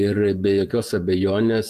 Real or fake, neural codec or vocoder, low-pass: fake; vocoder, 48 kHz, 128 mel bands, Vocos; 14.4 kHz